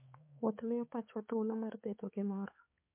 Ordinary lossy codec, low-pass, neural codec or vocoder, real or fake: MP3, 24 kbps; 3.6 kHz; codec, 16 kHz, 4 kbps, X-Codec, HuBERT features, trained on balanced general audio; fake